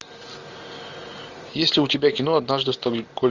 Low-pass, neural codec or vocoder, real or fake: 7.2 kHz; none; real